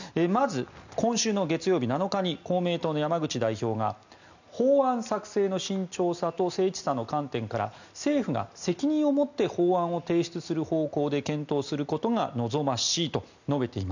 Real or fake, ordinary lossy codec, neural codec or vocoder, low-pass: real; none; none; 7.2 kHz